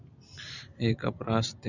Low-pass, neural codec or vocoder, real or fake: 7.2 kHz; none; real